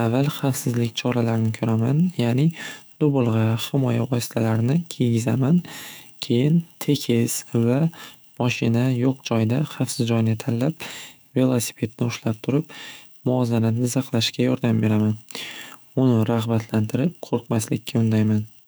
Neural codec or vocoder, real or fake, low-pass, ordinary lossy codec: autoencoder, 48 kHz, 128 numbers a frame, DAC-VAE, trained on Japanese speech; fake; none; none